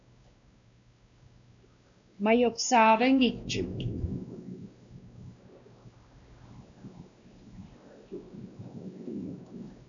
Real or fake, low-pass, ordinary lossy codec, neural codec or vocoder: fake; 7.2 kHz; AAC, 64 kbps; codec, 16 kHz, 1 kbps, X-Codec, WavLM features, trained on Multilingual LibriSpeech